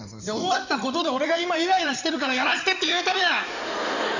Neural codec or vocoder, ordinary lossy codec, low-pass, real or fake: codec, 16 kHz in and 24 kHz out, 2.2 kbps, FireRedTTS-2 codec; none; 7.2 kHz; fake